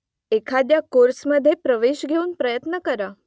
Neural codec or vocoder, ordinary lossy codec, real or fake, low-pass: none; none; real; none